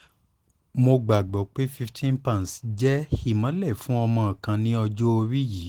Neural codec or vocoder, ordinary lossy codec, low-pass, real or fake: none; Opus, 16 kbps; 19.8 kHz; real